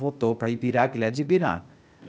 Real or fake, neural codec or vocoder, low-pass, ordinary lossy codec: fake; codec, 16 kHz, 0.8 kbps, ZipCodec; none; none